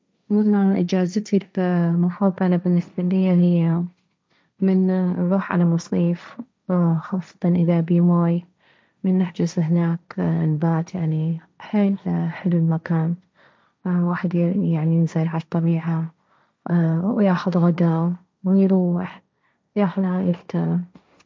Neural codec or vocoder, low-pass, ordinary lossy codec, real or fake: codec, 16 kHz, 1.1 kbps, Voila-Tokenizer; none; none; fake